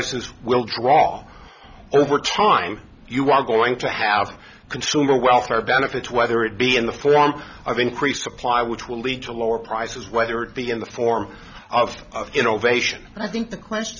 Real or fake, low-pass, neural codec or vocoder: real; 7.2 kHz; none